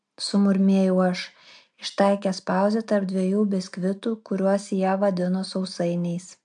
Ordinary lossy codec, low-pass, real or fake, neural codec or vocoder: MP3, 64 kbps; 9.9 kHz; real; none